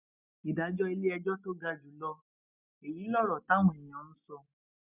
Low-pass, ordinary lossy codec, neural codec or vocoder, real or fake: 3.6 kHz; none; none; real